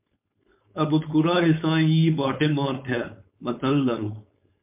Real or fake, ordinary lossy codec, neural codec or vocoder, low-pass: fake; MP3, 32 kbps; codec, 16 kHz, 4.8 kbps, FACodec; 3.6 kHz